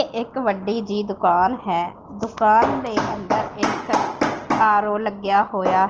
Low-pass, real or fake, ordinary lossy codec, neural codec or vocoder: 7.2 kHz; real; Opus, 16 kbps; none